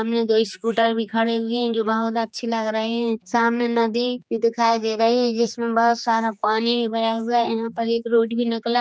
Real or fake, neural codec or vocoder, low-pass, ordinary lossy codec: fake; codec, 16 kHz, 2 kbps, X-Codec, HuBERT features, trained on general audio; none; none